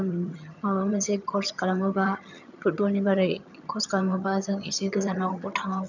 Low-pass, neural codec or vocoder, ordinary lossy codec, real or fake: 7.2 kHz; vocoder, 22.05 kHz, 80 mel bands, HiFi-GAN; none; fake